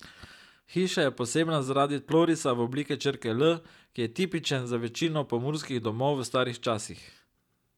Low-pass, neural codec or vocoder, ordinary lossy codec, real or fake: 19.8 kHz; none; none; real